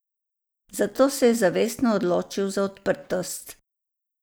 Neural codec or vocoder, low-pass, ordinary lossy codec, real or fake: none; none; none; real